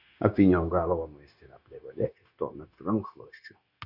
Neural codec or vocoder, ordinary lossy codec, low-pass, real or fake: codec, 16 kHz, 0.9 kbps, LongCat-Audio-Codec; Opus, 64 kbps; 5.4 kHz; fake